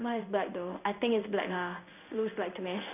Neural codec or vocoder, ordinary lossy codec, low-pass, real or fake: codec, 16 kHz in and 24 kHz out, 1 kbps, XY-Tokenizer; none; 3.6 kHz; fake